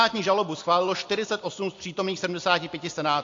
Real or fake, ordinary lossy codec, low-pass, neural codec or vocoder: real; AAC, 48 kbps; 7.2 kHz; none